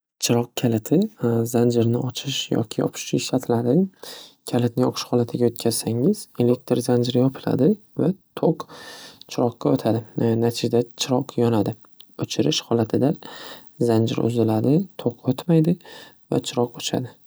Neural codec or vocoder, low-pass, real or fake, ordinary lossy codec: none; none; real; none